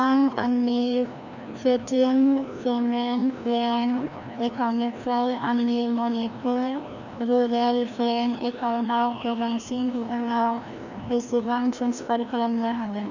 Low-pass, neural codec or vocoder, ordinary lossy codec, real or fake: 7.2 kHz; codec, 16 kHz, 1 kbps, FreqCodec, larger model; none; fake